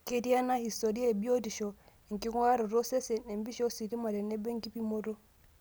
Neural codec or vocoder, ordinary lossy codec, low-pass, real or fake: none; none; none; real